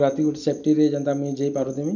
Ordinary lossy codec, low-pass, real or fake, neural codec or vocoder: none; 7.2 kHz; real; none